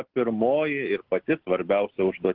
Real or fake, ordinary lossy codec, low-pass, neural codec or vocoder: real; Opus, 16 kbps; 5.4 kHz; none